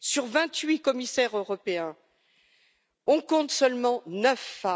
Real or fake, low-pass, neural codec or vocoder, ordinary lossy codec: real; none; none; none